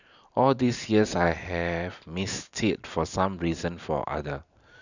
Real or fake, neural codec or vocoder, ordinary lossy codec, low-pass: fake; vocoder, 44.1 kHz, 128 mel bands every 512 samples, BigVGAN v2; none; 7.2 kHz